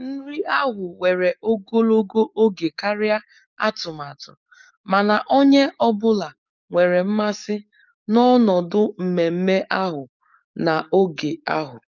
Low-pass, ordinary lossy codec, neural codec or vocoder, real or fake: 7.2 kHz; none; codec, 44.1 kHz, 7.8 kbps, DAC; fake